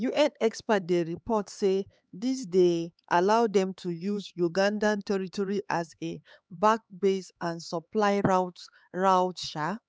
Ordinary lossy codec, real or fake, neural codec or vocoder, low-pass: none; fake; codec, 16 kHz, 4 kbps, X-Codec, HuBERT features, trained on LibriSpeech; none